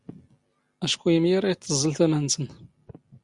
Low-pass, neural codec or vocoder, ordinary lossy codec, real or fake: 10.8 kHz; none; Opus, 64 kbps; real